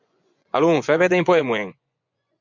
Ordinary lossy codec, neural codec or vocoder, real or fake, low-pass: MP3, 64 kbps; vocoder, 24 kHz, 100 mel bands, Vocos; fake; 7.2 kHz